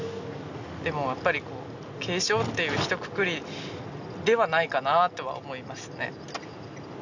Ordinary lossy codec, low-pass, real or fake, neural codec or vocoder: none; 7.2 kHz; real; none